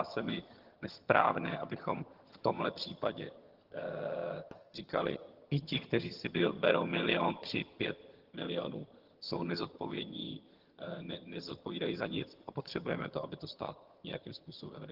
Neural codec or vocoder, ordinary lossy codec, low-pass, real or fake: vocoder, 22.05 kHz, 80 mel bands, HiFi-GAN; Opus, 16 kbps; 5.4 kHz; fake